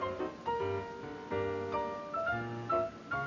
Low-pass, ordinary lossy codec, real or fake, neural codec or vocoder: 7.2 kHz; none; real; none